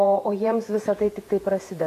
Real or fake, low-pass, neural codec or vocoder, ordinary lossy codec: fake; 14.4 kHz; vocoder, 48 kHz, 128 mel bands, Vocos; AAC, 48 kbps